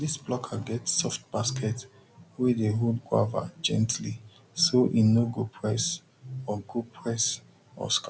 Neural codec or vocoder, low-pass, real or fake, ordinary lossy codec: none; none; real; none